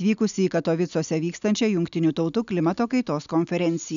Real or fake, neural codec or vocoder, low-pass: real; none; 7.2 kHz